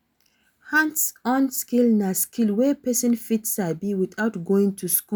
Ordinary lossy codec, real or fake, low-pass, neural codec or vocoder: none; real; none; none